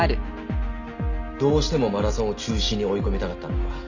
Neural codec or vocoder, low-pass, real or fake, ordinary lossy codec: none; 7.2 kHz; real; none